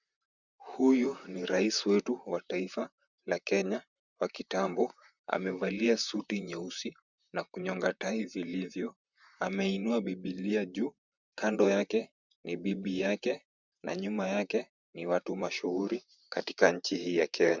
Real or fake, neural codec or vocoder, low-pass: fake; vocoder, 44.1 kHz, 128 mel bands, Pupu-Vocoder; 7.2 kHz